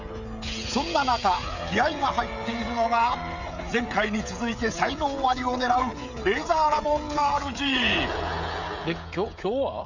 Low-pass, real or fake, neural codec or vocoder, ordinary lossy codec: 7.2 kHz; fake; codec, 16 kHz, 16 kbps, FreqCodec, smaller model; none